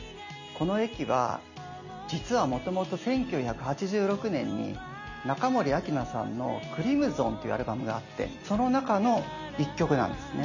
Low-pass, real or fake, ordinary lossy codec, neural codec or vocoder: 7.2 kHz; real; none; none